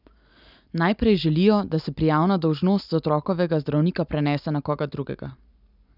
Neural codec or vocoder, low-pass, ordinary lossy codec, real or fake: none; 5.4 kHz; none; real